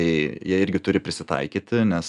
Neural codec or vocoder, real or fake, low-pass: none; real; 10.8 kHz